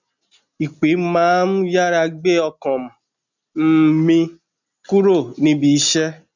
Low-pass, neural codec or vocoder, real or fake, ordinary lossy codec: 7.2 kHz; none; real; none